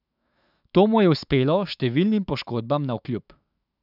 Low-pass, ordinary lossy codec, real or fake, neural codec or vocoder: 5.4 kHz; none; fake; autoencoder, 48 kHz, 128 numbers a frame, DAC-VAE, trained on Japanese speech